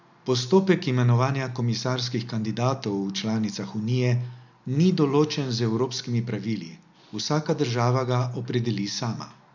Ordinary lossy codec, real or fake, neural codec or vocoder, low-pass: none; real; none; 7.2 kHz